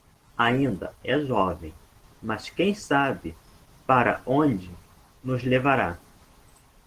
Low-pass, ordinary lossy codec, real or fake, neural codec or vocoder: 14.4 kHz; Opus, 16 kbps; real; none